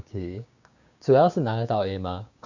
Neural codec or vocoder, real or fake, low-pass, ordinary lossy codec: codec, 16 kHz, 6 kbps, DAC; fake; 7.2 kHz; none